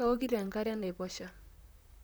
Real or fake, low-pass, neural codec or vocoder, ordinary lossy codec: fake; none; vocoder, 44.1 kHz, 128 mel bands, Pupu-Vocoder; none